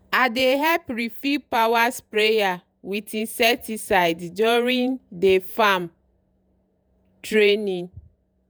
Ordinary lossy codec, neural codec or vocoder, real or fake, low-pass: none; vocoder, 48 kHz, 128 mel bands, Vocos; fake; none